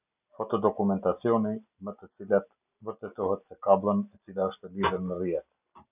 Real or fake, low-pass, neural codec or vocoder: real; 3.6 kHz; none